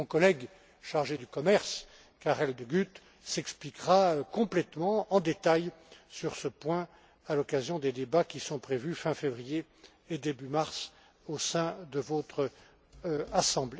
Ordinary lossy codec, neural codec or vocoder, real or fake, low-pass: none; none; real; none